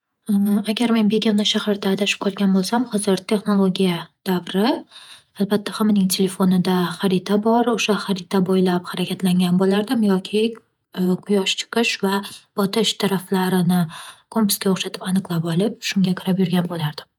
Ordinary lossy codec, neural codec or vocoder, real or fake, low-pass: none; vocoder, 48 kHz, 128 mel bands, Vocos; fake; 19.8 kHz